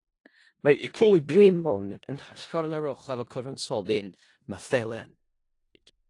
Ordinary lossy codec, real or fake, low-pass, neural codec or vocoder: AAC, 48 kbps; fake; 10.8 kHz; codec, 16 kHz in and 24 kHz out, 0.4 kbps, LongCat-Audio-Codec, four codebook decoder